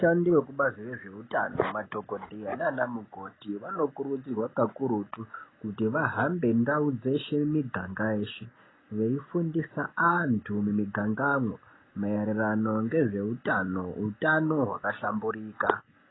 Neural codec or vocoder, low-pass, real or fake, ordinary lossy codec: none; 7.2 kHz; real; AAC, 16 kbps